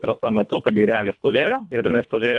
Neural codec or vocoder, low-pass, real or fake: codec, 24 kHz, 1.5 kbps, HILCodec; 10.8 kHz; fake